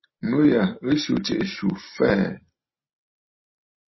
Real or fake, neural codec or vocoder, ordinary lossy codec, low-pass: real; none; MP3, 24 kbps; 7.2 kHz